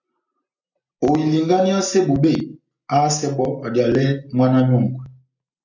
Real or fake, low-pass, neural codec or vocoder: real; 7.2 kHz; none